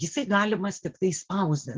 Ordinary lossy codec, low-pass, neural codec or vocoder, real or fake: Opus, 16 kbps; 7.2 kHz; none; real